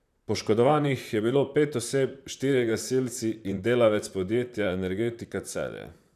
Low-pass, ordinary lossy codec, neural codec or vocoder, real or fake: 14.4 kHz; none; vocoder, 44.1 kHz, 128 mel bands, Pupu-Vocoder; fake